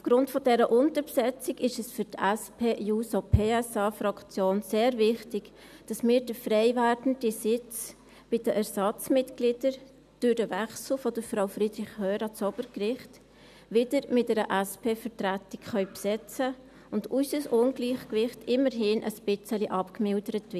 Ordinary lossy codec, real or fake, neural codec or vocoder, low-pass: none; real; none; 14.4 kHz